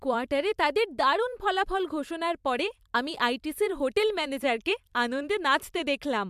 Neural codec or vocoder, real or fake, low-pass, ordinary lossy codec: vocoder, 44.1 kHz, 128 mel bands every 256 samples, BigVGAN v2; fake; 14.4 kHz; MP3, 96 kbps